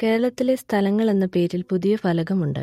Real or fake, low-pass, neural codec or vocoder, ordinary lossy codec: real; 19.8 kHz; none; MP3, 64 kbps